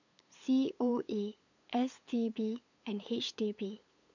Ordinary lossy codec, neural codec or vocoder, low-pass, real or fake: none; codec, 16 kHz, 8 kbps, FunCodec, trained on LibriTTS, 25 frames a second; 7.2 kHz; fake